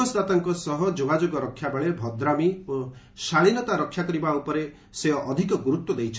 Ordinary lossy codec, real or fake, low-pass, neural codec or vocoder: none; real; none; none